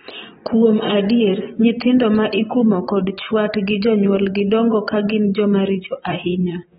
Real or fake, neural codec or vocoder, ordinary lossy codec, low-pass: fake; vocoder, 44.1 kHz, 128 mel bands every 256 samples, BigVGAN v2; AAC, 16 kbps; 19.8 kHz